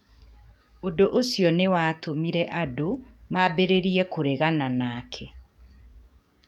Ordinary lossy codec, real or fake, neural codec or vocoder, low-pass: none; fake; codec, 44.1 kHz, 7.8 kbps, DAC; 19.8 kHz